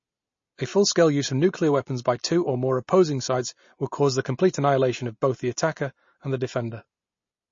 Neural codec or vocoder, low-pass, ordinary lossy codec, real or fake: none; 7.2 kHz; MP3, 32 kbps; real